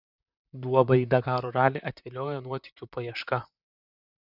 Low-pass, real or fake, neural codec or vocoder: 5.4 kHz; fake; vocoder, 44.1 kHz, 128 mel bands, Pupu-Vocoder